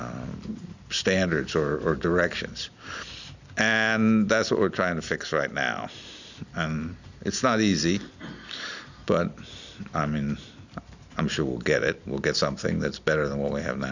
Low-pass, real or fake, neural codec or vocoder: 7.2 kHz; real; none